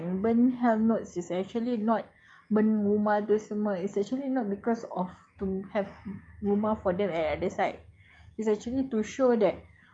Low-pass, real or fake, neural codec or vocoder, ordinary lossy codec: 9.9 kHz; fake; codec, 44.1 kHz, 7.8 kbps, DAC; none